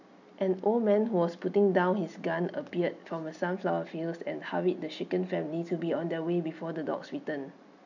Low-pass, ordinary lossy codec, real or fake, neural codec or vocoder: 7.2 kHz; none; real; none